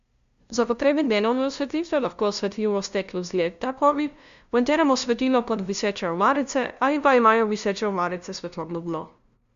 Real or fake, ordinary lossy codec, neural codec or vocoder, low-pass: fake; Opus, 64 kbps; codec, 16 kHz, 0.5 kbps, FunCodec, trained on LibriTTS, 25 frames a second; 7.2 kHz